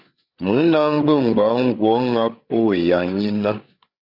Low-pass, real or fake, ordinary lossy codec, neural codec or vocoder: 5.4 kHz; fake; Opus, 64 kbps; codec, 16 kHz, 8 kbps, FreqCodec, larger model